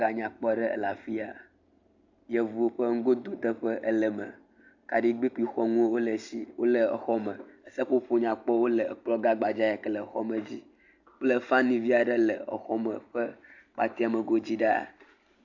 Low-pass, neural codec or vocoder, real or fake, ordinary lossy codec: 7.2 kHz; none; real; AAC, 48 kbps